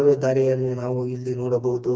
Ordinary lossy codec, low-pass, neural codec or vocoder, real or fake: none; none; codec, 16 kHz, 2 kbps, FreqCodec, smaller model; fake